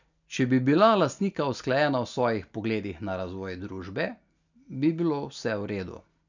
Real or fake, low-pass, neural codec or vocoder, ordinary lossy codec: real; 7.2 kHz; none; none